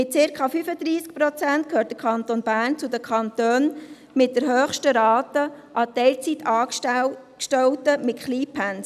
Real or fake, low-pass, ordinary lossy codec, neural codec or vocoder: real; 14.4 kHz; none; none